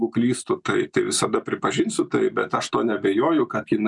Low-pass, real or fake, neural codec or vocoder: 10.8 kHz; real; none